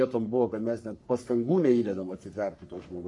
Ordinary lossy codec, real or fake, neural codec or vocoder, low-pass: MP3, 48 kbps; fake; codec, 44.1 kHz, 3.4 kbps, Pupu-Codec; 10.8 kHz